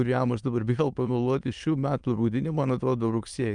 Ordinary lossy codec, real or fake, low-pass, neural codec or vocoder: Opus, 32 kbps; fake; 9.9 kHz; autoencoder, 22.05 kHz, a latent of 192 numbers a frame, VITS, trained on many speakers